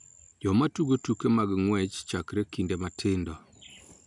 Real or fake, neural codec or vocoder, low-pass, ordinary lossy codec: real; none; 10.8 kHz; none